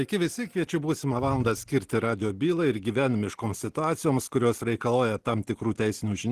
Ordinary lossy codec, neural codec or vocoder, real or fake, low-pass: Opus, 16 kbps; none; real; 14.4 kHz